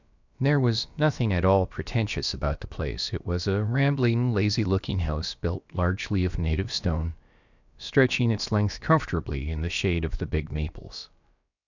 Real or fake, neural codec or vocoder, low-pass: fake; codec, 16 kHz, about 1 kbps, DyCAST, with the encoder's durations; 7.2 kHz